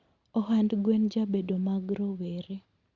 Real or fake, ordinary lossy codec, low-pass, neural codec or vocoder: real; Opus, 64 kbps; 7.2 kHz; none